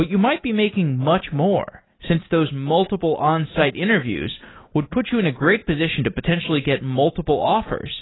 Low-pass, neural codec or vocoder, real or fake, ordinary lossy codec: 7.2 kHz; none; real; AAC, 16 kbps